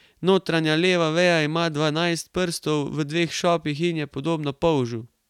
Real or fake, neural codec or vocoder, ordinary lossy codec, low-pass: real; none; none; 19.8 kHz